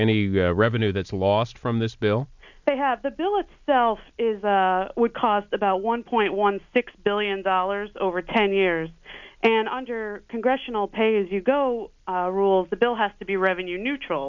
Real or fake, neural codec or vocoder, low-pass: real; none; 7.2 kHz